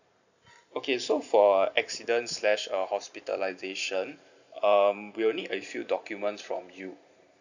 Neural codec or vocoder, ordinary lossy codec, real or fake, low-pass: vocoder, 44.1 kHz, 128 mel bands every 512 samples, BigVGAN v2; none; fake; 7.2 kHz